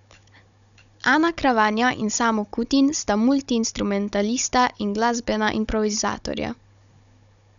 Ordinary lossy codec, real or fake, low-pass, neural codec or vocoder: none; fake; 7.2 kHz; codec, 16 kHz, 16 kbps, FunCodec, trained on Chinese and English, 50 frames a second